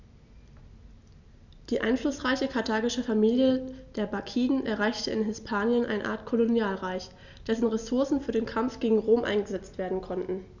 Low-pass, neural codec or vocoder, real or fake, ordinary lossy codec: 7.2 kHz; none; real; none